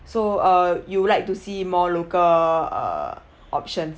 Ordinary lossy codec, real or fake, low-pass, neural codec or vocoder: none; real; none; none